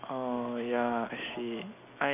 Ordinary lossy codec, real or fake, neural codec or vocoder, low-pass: none; real; none; 3.6 kHz